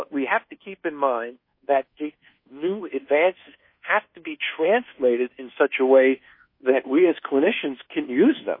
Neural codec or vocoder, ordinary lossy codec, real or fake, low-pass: codec, 24 kHz, 1.2 kbps, DualCodec; MP3, 24 kbps; fake; 5.4 kHz